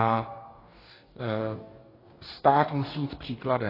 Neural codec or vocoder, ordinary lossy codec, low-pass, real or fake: codec, 44.1 kHz, 2.6 kbps, DAC; AAC, 24 kbps; 5.4 kHz; fake